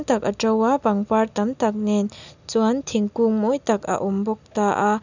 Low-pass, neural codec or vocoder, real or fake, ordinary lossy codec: 7.2 kHz; none; real; none